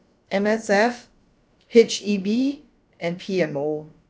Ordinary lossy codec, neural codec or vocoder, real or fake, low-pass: none; codec, 16 kHz, about 1 kbps, DyCAST, with the encoder's durations; fake; none